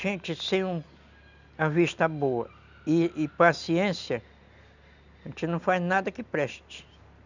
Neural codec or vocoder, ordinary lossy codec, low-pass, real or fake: none; none; 7.2 kHz; real